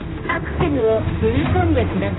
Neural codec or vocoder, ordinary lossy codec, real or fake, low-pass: codec, 16 kHz, 1 kbps, X-Codec, HuBERT features, trained on general audio; AAC, 16 kbps; fake; 7.2 kHz